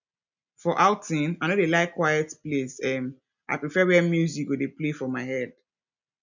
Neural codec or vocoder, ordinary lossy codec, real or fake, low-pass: none; none; real; 7.2 kHz